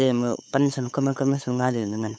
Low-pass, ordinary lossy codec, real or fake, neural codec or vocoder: none; none; fake; codec, 16 kHz, 8 kbps, FunCodec, trained on LibriTTS, 25 frames a second